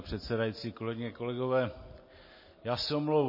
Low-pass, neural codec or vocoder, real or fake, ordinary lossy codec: 5.4 kHz; none; real; MP3, 24 kbps